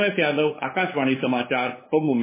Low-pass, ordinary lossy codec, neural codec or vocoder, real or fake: 3.6 kHz; MP3, 16 kbps; codec, 16 kHz, 8 kbps, FunCodec, trained on LibriTTS, 25 frames a second; fake